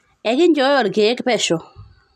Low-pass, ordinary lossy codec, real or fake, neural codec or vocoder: 14.4 kHz; none; real; none